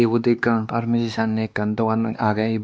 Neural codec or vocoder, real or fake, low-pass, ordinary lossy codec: codec, 16 kHz, 2 kbps, X-Codec, WavLM features, trained on Multilingual LibriSpeech; fake; none; none